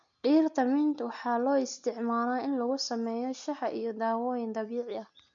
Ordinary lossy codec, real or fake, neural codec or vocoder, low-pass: MP3, 64 kbps; real; none; 7.2 kHz